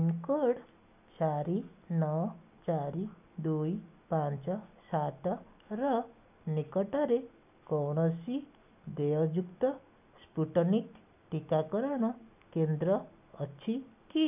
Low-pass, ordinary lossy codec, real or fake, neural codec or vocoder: 3.6 kHz; none; real; none